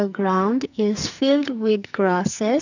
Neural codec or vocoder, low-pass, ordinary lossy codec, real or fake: codec, 44.1 kHz, 2.6 kbps, SNAC; 7.2 kHz; none; fake